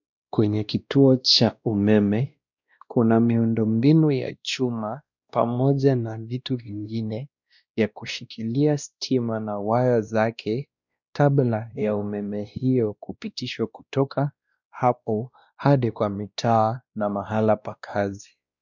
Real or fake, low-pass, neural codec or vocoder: fake; 7.2 kHz; codec, 16 kHz, 1 kbps, X-Codec, WavLM features, trained on Multilingual LibriSpeech